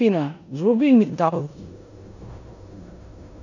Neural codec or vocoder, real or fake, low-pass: codec, 16 kHz in and 24 kHz out, 0.9 kbps, LongCat-Audio-Codec, four codebook decoder; fake; 7.2 kHz